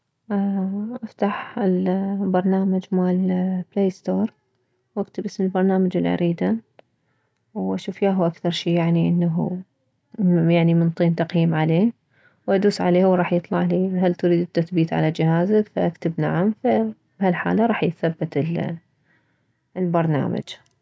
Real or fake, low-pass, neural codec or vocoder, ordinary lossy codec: real; none; none; none